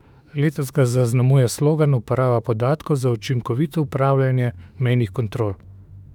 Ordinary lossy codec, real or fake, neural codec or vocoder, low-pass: none; fake; autoencoder, 48 kHz, 32 numbers a frame, DAC-VAE, trained on Japanese speech; 19.8 kHz